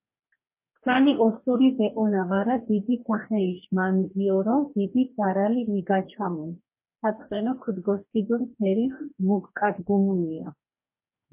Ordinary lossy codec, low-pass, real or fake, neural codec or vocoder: MP3, 24 kbps; 3.6 kHz; fake; codec, 44.1 kHz, 2.6 kbps, DAC